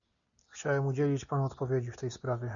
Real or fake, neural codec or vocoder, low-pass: real; none; 7.2 kHz